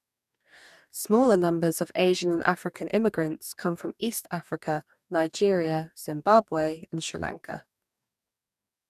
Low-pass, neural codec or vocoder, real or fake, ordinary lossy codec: 14.4 kHz; codec, 44.1 kHz, 2.6 kbps, DAC; fake; none